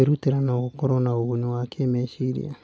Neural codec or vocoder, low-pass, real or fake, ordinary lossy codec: none; none; real; none